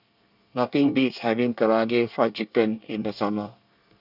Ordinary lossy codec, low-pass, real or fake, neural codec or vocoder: none; 5.4 kHz; fake; codec, 24 kHz, 1 kbps, SNAC